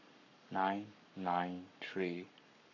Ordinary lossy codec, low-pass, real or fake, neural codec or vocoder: none; 7.2 kHz; fake; codec, 44.1 kHz, 7.8 kbps, Pupu-Codec